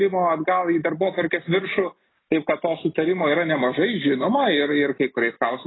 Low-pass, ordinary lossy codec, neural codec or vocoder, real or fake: 7.2 kHz; AAC, 16 kbps; none; real